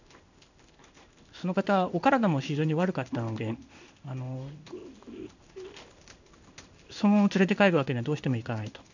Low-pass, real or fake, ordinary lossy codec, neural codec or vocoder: 7.2 kHz; fake; none; codec, 16 kHz in and 24 kHz out, 1 kbps, XY-Tokenizer